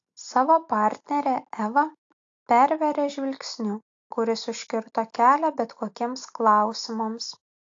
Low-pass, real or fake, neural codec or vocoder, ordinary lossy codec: 7.2 kHz; real; none; AAC, 48 kbps